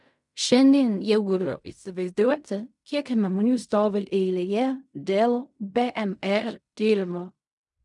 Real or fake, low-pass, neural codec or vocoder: fake; 10.8 kHz; codec, 16 kHz in and 24 kHz out, 0.4 kbps, LongCat-Audio-Codec, fine tuned four codebook decoder